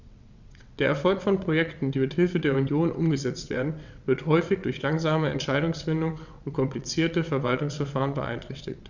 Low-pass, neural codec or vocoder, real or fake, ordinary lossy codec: 7.2 kHz; vocoder, 22.05 kHz, 80 mel bands, WaveNeXt; fake; none